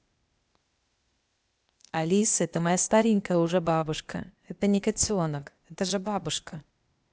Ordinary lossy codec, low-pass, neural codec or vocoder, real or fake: none; none; codec, 16 kHz, 0.8 kbps, ZipCodec; fake